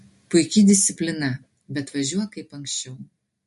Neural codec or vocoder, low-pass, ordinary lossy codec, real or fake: none; 14.4 kHz; MP3, 48 kbps; real